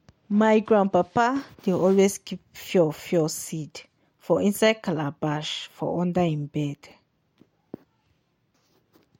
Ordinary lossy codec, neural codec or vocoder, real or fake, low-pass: MP3, 64 kbps; none; real; 19.8 kHz